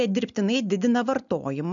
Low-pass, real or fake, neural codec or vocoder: 7.2 kHz; real; none